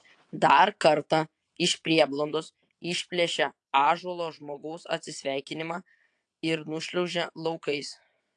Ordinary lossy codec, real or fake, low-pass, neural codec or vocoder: AAC, 64 kbps; fake; 9.9 kHz; vocoder, 22.05 kHz, 80 mel bands, WaveNeXt